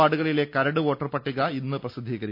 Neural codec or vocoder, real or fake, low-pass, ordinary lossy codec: none; real; 5.4 kHz; none